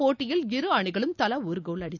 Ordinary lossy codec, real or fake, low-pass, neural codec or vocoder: none; real; 7.2 kHz; none